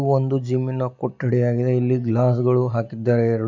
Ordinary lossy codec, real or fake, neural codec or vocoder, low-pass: MP3, 64 kbps; real; none; 7.2 kHz